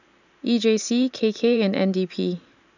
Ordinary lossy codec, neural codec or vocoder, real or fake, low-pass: none; vocoder, 44.1 kHz, 128 mel bands every 512 samples, BigVGAN v2; fake; 7.2 kHz